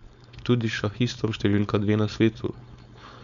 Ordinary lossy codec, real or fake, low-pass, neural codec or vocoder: none; fake; 7.2 kHz; codec, 16 kHz, 4.8 kbps, FACodec